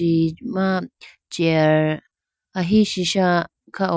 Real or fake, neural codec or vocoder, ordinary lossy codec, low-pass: real; none; none; none